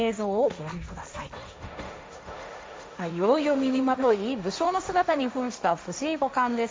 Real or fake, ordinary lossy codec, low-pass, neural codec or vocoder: fake; none; none; codec, 16 kHz, 1.1 kbps, Voila-Tokenizer